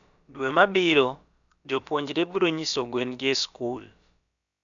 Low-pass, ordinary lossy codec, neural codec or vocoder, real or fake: 7.2 kHz; none; codec, 16 kHz, about 1 kbps, DyCAST, with the encoder's durations; fake